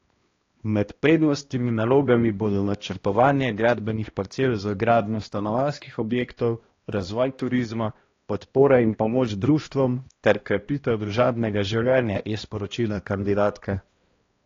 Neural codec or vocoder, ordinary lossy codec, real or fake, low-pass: codec, 16 kHz, 1 kbps, X-Codec, HuBERT features, trained on balanced general audio; AAC, 32 kbps; fake; 7.2 kHz